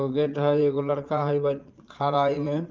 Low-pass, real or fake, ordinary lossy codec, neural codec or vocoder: 7.2 kHz; fake; Opus, 24 kbps; vocoder, 22.05 kHz, 80 mel bands, Vocos